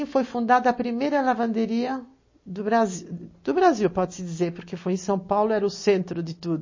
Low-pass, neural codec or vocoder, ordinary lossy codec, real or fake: 7.2 kHz; none; MP3, 32 kbps; real